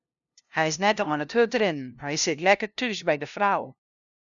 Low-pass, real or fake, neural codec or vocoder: 7.2 kHz; fake; codec, 16 kHz, 0.5 kbps, FunCodec, trained on LibriTTS, 25 frames a second